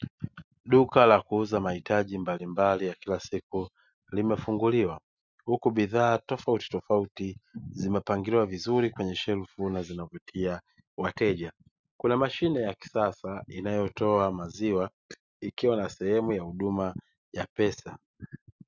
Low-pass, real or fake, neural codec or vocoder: 7.2 kHz; real; none